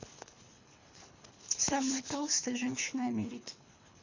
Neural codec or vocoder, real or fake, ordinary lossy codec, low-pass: codec, 24 kHz, 3 kbps, HILCodec; fake; Opus, 64 kbps; 7.2 kHz